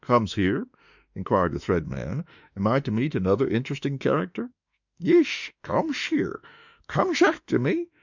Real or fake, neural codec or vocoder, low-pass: fake; autoencoder, 48 kHz, 32 numbers a frame, DAC-VAE, trained on Japanese speech; 7.2 kHz